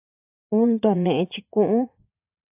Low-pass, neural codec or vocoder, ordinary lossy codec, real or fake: 3.6 kHz; vocoder, 44.1 kHz, 80 mel bands, Vocos; AAC, 24 kbps; fake